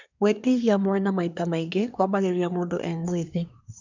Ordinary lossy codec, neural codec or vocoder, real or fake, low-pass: none; codec, 24 kHz, 1 kbps, SNAC; fake; 7.2 kHz